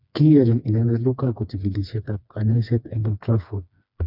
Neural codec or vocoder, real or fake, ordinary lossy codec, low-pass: codec, 16 kHz, 2 kbps, FreqCodec, smaller model; fake; none; 5.4 kHz